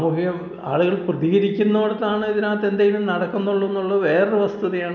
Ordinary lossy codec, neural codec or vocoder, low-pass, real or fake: none; none; 7.2 kHz; real